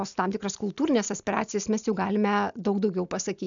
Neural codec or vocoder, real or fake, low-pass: none; real; 7.2 kHz